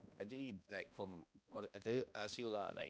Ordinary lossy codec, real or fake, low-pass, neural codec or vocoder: none; fake; none; codec, 16 kHz, 1 kbps, X-Codec, HuBERT features, trained on balanced general audio